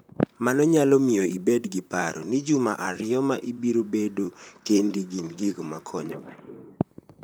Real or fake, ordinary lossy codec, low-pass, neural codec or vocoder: fake; none; none; vocoder, 44.1 kHz, 128 mel bands, Pupu-Vocoder